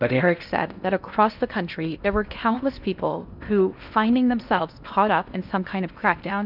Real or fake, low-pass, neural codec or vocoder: fake; 5.4 kHz; codec, 16 kHz in and 24 kHz out, 0.8 kbps, FocalCodec, streaming, 65536 codes